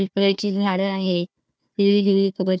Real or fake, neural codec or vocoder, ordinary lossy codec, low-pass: fake; codec, 16 kHz, 1 kbps, FunCodec, trained on Chinese and English, 50 frames a second; none; none